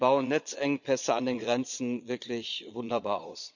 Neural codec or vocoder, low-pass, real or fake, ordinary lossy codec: vocoder, 22.05 kHz, 80 mel bands, Vocos; 7.2 kHz; fake; none